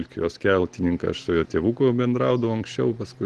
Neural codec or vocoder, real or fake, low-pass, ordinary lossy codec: none; real; 10.8 kHz; Opus, 16 kbps